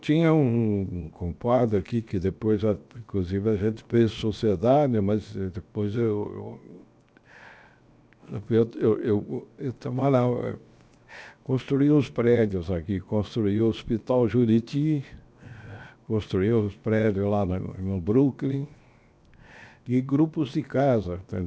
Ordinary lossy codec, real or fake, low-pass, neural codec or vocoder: none; fake; none; codec, 16 kHz, 0.7 kbps, FocalCodec